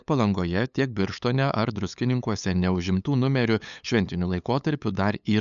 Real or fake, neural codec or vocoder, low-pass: fake; codec, 16 kHz, 8 kbps, FunCodec, trained on LibriTTS, 25 frames a second; 7.2 kHz